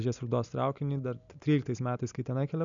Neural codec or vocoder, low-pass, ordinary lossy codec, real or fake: none; 7.2 kHz; MP3, 96 kbps; real